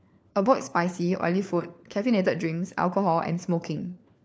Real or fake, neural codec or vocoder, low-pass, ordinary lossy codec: fake; codec, 16 kHz, 4 kbps, FunCodec, trained on LibriTTS, 50 frames a second; none; none